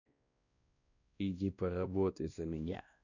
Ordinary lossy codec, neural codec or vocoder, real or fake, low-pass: none; codec, 16 kHz, 1 kbps, X-Codec, WavLM features, trained on Multilingual LibriSpeech; fake; 7.2 kHz